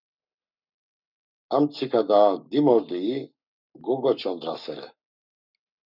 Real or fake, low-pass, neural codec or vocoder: fake; 5.4 kHz; codec, 44.1 kHz, 7.8 kbps, Pupu-Codec